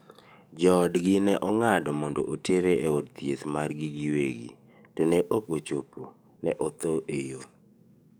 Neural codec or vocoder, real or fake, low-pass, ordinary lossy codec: codec, 44.1 kHz, 7.8 kbps, DAC; fake; none; none